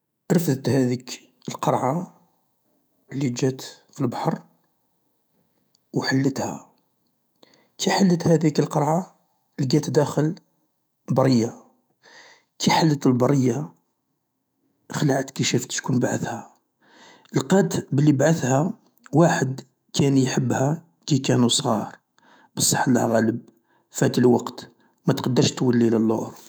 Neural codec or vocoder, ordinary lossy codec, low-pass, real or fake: autoencoder, 48 kHz, 128 numbers a frame, DAC-VAE, trained on Japanese speech; none; none; fake